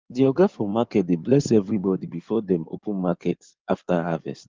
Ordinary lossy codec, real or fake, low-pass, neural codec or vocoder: Opus, 32 kbps; fake; 7.2 kHz; codec, 16 kHz in and 24 kHz out, 2.2 kbps, FireRedTTS-2 codec